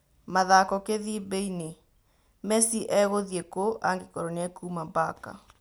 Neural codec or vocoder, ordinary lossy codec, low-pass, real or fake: none; none; none; real